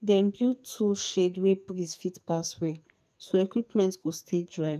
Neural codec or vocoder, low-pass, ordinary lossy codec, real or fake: codec, 44.1 kHz, 2.6 kbps, SNAC; 14.4 kHz; none; fake